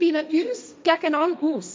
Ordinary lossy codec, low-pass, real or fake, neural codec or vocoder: none; none; fake; codec, 16 kHz, 1.1 kbps, Voila-Tokenizer